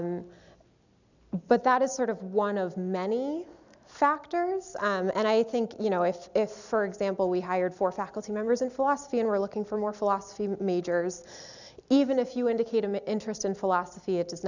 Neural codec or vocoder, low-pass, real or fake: none; 7.2 kHz; real